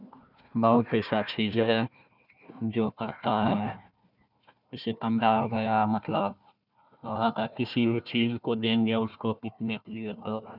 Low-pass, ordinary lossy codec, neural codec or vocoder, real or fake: 5.4 kHz; none; codec, 16 kHz, 1 kbps, FunCodec, trained on Chinese and English, 50 frames a second; fake